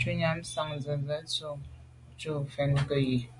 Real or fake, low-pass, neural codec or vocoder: real; 10.8 kHz; none